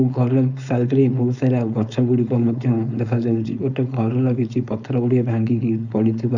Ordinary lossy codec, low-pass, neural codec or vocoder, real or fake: none; 7.2 kHz; codec, 16 kHz, 4.8 kbps, FACodec; fake